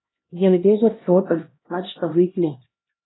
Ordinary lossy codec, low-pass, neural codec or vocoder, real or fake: AAC, 16 kbps; 7.2 kHz; codec, 16 kHz, 1 kbps, X-Codec, HuBERT features, trained on LibriSpeech; fake